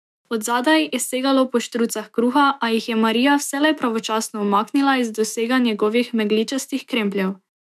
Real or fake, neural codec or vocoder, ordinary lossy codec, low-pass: fake; autoencoder, 48 kHz, 128 numbers a frame, DAC-VAE, trained on Japanese speech; none; 14.4 kHz